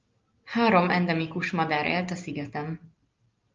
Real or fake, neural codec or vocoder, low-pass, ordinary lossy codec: real; none; 7.2 kHz; Opus, 24 kbps